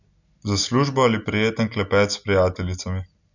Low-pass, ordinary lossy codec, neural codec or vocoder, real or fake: 7.2 kHz; none; none; real